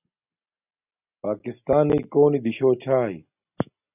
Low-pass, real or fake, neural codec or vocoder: 3.6 kHz; real; none